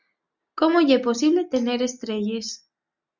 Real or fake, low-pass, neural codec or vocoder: real; 7.2 kHz; none